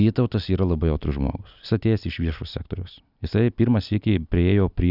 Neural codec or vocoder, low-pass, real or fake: vocoder, 44.1 kHz, 128 mel bands every 256 samples, BigVGAN v2; 5.4 kHz; fake